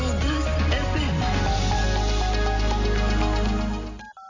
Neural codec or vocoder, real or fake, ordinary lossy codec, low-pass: none; real; AAC, 48 kbps; 7.2 kHz